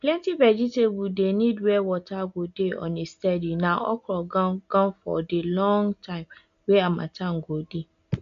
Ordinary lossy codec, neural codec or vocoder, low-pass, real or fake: AAC, 64 kbps; none; 7.2 kHz; real